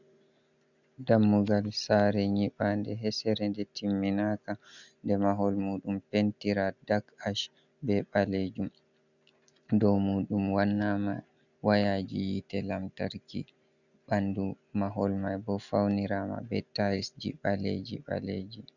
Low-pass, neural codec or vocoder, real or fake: 7.2 kHz; none; real